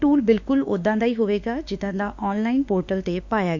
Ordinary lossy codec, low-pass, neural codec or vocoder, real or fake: none; 7.2 kHz; autoencoder, 48 kHz, 32 numbers a frame, DAC-VAE, trained on Japanese speech; fake